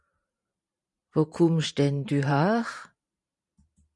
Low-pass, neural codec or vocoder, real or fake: 10.8 kHz; vocoder, 44.1 kHz, 128 mel bands every 512 samples, BigVGAN v2; fake